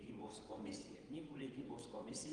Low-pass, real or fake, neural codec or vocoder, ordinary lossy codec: 9.9 kHz; fake; vocoder, 22.05 kHz, 80 mel bands, WaveNeXt; Opus, 24 kbps